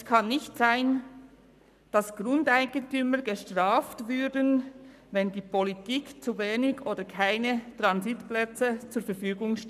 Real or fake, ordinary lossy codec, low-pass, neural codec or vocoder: fake; none; 14.4 kHz; codec, 44.1 kHz, 7.8 kbps, Pupu-Codec